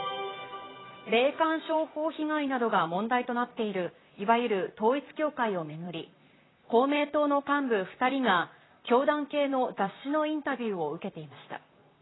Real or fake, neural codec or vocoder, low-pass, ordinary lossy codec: fake; vocoder, 44.1 kHz, 128 mel bands, Pupu-Vocoder; 7.2 kHz; AAC, 16 kbps